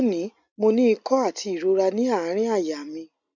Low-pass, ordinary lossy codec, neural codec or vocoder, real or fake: 7.2 kHz; none; none; real